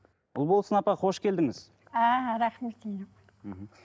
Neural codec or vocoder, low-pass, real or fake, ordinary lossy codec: none; none; real; none